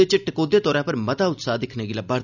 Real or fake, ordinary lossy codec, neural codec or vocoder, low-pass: real; none; none; 7.2 kHz